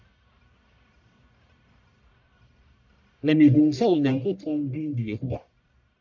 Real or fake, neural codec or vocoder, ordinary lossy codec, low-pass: fake; codec, 44.1 kHz, 1.7 kbps, Pupu-Codec; MP3, 64 kbps; 7.2 kHz